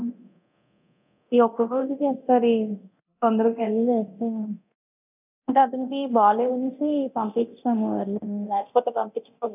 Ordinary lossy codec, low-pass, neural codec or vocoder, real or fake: none; 3.6 kHz; codec, 24 kHz, 0.9 kbps, DualCodec; fake